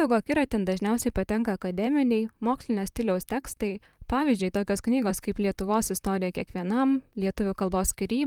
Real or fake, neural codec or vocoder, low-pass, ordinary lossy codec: fake; vocoder, 44.1 kHz, 128 mel bands, Pupu-Vocoder; 19.8 kHz; Opus, 32 kbps